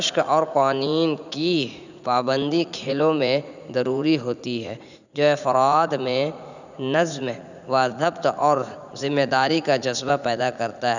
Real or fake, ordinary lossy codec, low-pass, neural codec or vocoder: fake; none; 7.2 kHz; vocoder, 44.1 kHz, 80 mel bands, Vocos